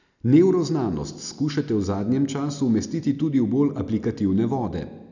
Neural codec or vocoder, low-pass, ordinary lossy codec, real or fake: none; 7.2 kHz; none; real